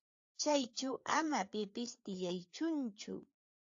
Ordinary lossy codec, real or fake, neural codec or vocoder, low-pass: AAC, 48 kbps; fake; codec, 16 kHz, 4 kbps, FreqCodec, larger model; 7.2 kHz